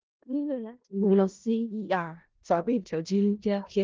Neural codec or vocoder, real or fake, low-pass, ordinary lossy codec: codec, 16 kHz in and 24 kHz out, 0.4 kbps, LongCat-Audio-Codec, four codebook decoder; fake; 7.2 kHz; Opus, 16 kbps